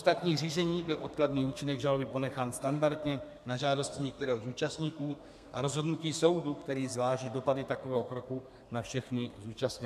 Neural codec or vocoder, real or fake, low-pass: codec, 32 kHz, 1.9 kbps, SNAC; fake; 14.4 kHz